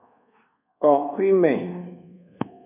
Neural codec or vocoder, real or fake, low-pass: codec, 24 kHz, 1.2 kbps, DualCodec; fake; 3.6 kHz